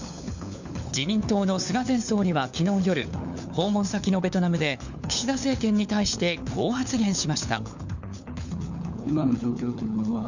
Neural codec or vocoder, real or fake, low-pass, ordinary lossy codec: codec, 16 kHz, 4 kbps, FunCodec, trained on LibriTTS, 50 frames a second; fake; 7.2 kHz; none